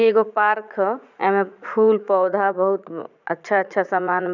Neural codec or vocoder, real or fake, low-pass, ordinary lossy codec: vocoder, 44.1 kHz, 80 mel bands, Vocos; fake; 7.2 kHz; none